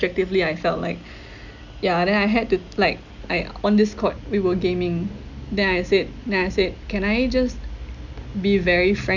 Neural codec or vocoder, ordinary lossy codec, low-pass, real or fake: none; none; 7.2 kHz; real